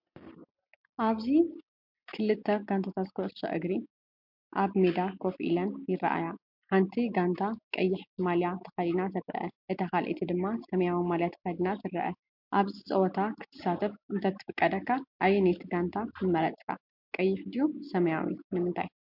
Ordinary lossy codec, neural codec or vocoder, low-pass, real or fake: MP3, 48 kbps; none; 5.4 kHz; real